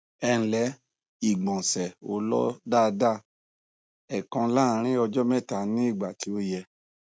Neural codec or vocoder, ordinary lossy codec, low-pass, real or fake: none; none; none; real